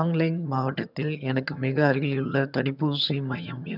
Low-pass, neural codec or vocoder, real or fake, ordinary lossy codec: 5.4 kHz; vocoder, 22.05 kHz, 80 mel bands, HiFi-GAN; fake; none